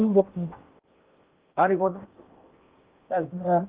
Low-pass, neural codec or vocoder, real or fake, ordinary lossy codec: 3.6 kHz; codec, 16 kHz in and 24 kHz out, 0.8 kbps, FocalCodec, streaming, 65536 codes; fake; Opus, 24 kbps